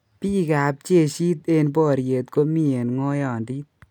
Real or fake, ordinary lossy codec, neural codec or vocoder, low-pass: real; none; none; none